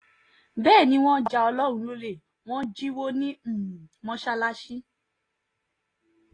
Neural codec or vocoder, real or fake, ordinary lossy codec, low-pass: none; real; AAC, 32 kbps; 9.9 kHz